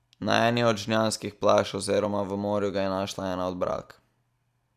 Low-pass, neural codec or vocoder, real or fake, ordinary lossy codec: 14.4 kHz; none; real; none